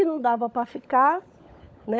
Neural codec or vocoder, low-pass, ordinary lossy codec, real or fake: codec, 16 kHz, 16 kbps, FunCodec, trained on LibriTTS, 50 frames a second; none; none; fake